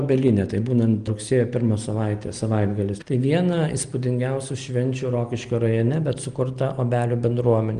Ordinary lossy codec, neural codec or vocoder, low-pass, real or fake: Opus, 24 kbps; none; 9.9 kHz; real